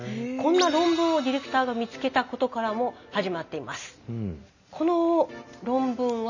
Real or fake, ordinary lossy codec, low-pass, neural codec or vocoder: real; none; 7.2 kHz; none